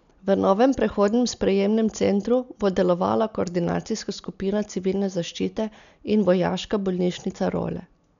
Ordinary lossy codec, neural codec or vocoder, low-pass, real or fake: none; none; 7.2 kHz; real